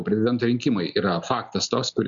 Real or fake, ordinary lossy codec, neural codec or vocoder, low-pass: real; MP3, 96 kbps; none; 7.2 kHz